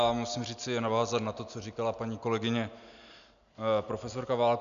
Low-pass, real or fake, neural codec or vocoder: 7.2 kHz; real; none